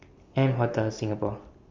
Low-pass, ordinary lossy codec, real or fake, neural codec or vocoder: 7.2 kHz; Opus, 32 kbps; real; none